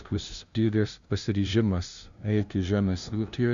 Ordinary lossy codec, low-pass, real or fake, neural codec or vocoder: Opus, 64 kbps; 7.2 kHz; fake; codec, 16 kHz, 0.5 kbps, FunCodec, trained on LibriTTS, 25 frames a second